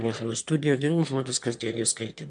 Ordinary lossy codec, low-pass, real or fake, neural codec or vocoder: MP3, 64 kbps; 9.9 kHz; fake; autoencoder, 22.05 kHz, a latent of 192 numbers a frame, VITS, trained on one speaker